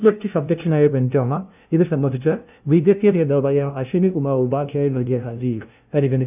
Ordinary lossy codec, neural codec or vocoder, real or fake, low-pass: none; codec, 16 kHz, 0.5 kbps, FunCodec, trained on LibriTTS, 25 frames a second; fake; 3.6 kHz